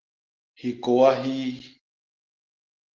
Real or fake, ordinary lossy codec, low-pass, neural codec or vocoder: real; Opus, 16 kbps; 7.2 kHz; none